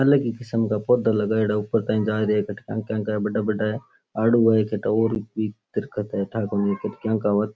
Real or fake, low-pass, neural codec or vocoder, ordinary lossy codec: real; none; none; none